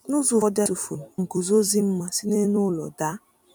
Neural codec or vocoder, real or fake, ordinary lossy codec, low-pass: vocoder, 44.1 kHz, 128 mel bands every 256 samples, BigVGAN v2; fake; none; 19.8 kHz